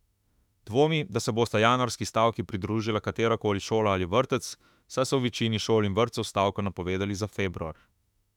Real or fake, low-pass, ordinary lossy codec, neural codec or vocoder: fake; 19.8 kHz; none; autoencoder, 48 kHz, 32 numbers a frame, DAC-VAE, trained on Japanese speech